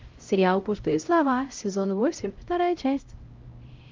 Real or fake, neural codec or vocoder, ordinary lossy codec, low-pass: fake; codec, 16 kHz, 0.5 kbps, X-Codec, HuBERT features, trained on LibriSpeech; Opus, 32 kbps; 7.2 kHz